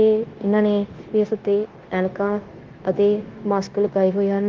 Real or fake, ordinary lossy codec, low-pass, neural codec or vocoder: fake; Opus, 16 kbps; 7.2 kHz; codec, 16 kHz, 0.9 kbps, LongCat-Audio-Codec